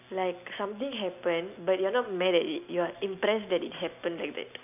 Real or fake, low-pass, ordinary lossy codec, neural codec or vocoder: real; 3.6 kHz; none; none